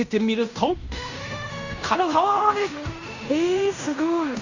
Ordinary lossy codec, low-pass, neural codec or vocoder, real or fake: Opus, 64 kbps; 7.2 kHz; codec, 16 kHz in and 24 kHz out, 0.9 kbps, LongCat-Audio-Codec, fine tuned four codebook decoder; fake